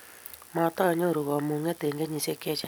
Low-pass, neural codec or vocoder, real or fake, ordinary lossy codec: none; none; real; none